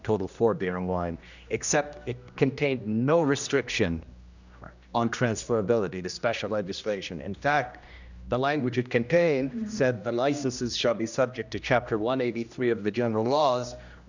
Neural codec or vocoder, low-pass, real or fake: codec, 16 kHz, 1 kbps, X-Codec, HuBERT features, trained on general audio; 7.2 kHz; fake